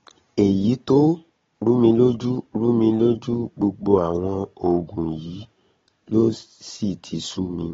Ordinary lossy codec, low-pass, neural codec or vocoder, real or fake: AAC, 24 kbps; 7.2 kHz; codec, 16 kHz, 16 kbps, FunCodec, trained on Chinese and English, 50 frames a second; fake